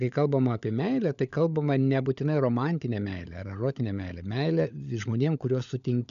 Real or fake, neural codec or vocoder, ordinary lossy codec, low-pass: fake; codec, 16 kHz, 16 kbps, FunCodec, trained on LibriTTS, 50 frames a second; MP3, 96 kbps; 7.2 kHz